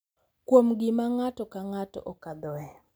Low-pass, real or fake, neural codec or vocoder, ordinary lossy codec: none; real; none; none